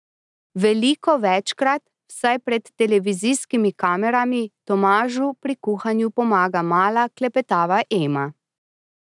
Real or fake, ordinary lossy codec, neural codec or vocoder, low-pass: real; none; none; 10.8 kHz